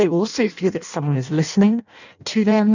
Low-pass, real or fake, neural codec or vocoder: 7.2 kHz; fake; codec, 16 kHz in and 24 kHz out, 0.6 kbps, FireRedTTS-2 codec